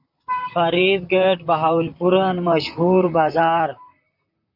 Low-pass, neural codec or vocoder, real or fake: 5.4 kHz; vocoder, 44.1 kHz, 128 mel bands, Pupu-Vocoder; fake